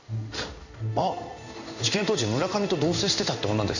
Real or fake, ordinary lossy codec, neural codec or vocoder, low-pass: fake; none; codec, 16 kHz in and 24 kHz out, 1 kbps, XY-Tokenizer; 7.2 kHz